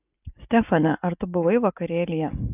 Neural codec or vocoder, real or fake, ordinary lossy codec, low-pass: none; real; AAC, 32 kbps; 3.6 kHz